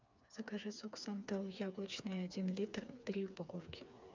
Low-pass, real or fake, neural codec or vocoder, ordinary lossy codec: 7.2 kHz; fake; codec, 16 kHz, 4 kbps, FreqCodec, smaller model; MP3, 64 kbps